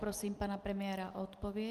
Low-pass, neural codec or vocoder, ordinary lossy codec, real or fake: 14.4 kHz; none; Opus, 32 kbps; real